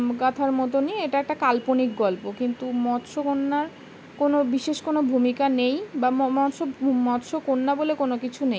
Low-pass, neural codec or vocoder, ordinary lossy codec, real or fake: none; none; none; real